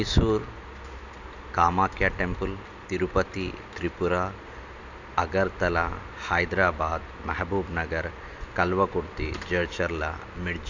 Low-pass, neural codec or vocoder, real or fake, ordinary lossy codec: 7.2 kHz; none; real; none